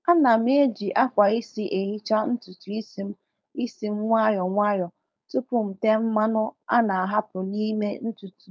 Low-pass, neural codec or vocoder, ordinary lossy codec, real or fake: none; codec, 16 kHz, 4.8 kbps, FACodec; none; fake